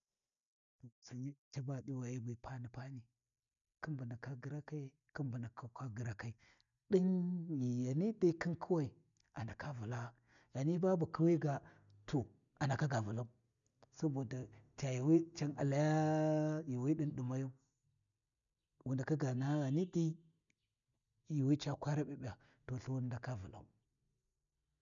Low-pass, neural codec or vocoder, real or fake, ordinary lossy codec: 7.2 kHz; none; real; none